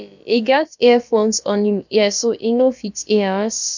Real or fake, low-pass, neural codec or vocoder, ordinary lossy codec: fake; 7.2 kHz; codec, 16 kHz, about 1 kbps, DyCAST, with the encoder's durations; none